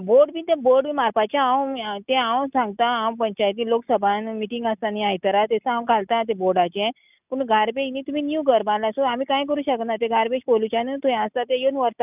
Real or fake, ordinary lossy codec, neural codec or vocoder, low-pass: real; none; none; 3.6 kHz